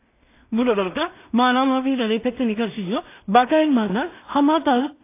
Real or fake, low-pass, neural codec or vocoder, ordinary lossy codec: fake; 3.6 kHz; codec, 16 kHz in and 24 kHz out, 0.4 kbps, LongCat-Audio-Codec, two codebook decoder; AAC, 24 kbps